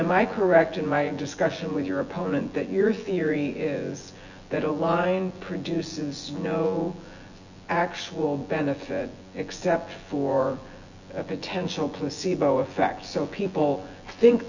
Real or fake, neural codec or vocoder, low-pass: fake; vocoder, 24 kHz, 100 mel bands, Vocos; 7.2 kHz